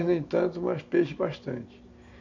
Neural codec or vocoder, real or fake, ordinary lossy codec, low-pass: none; real; none; 7.2 kHz